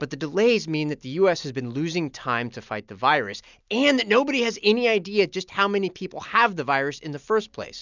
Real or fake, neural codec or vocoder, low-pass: real; none; 7.2 kHz